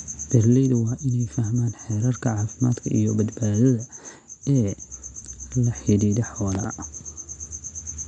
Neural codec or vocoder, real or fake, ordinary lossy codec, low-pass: none; real; none; 9.9 kHz